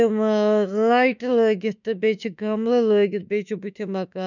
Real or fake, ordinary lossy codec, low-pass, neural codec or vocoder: fake; none; 7.2 kHz; autoencoder, 48 kHz, 32 numbers a frame, DAC-VAE, trained on Japanese speech